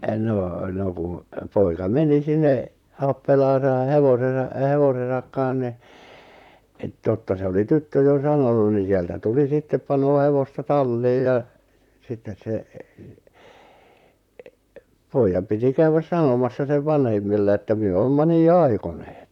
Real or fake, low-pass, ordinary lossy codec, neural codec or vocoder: fake; 19.8 kHz; none; vocoder, 44.1 kHz, 128 mel bands, Pupu-Vocoder